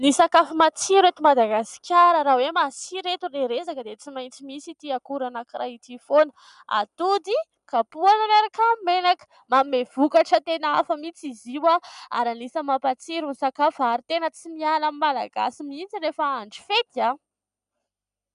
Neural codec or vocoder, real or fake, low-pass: none; real; 10.8 kHz